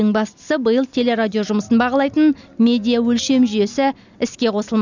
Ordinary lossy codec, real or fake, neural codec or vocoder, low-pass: none; real; none; 7.2 kHz